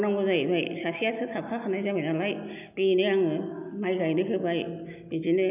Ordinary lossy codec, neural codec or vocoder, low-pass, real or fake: none; none; 3.6 kHz; real